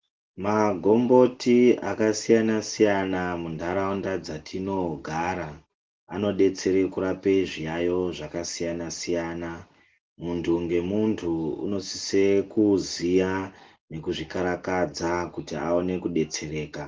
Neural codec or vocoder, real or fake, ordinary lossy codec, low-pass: none; real; Opus, 16 kbps; 7.2 kHz